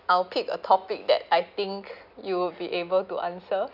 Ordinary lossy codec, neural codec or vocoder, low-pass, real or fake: none; none; 5.4 kHz; real